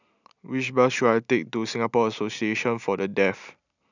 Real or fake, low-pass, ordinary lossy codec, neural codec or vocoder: real; 7.2 kHz; none; none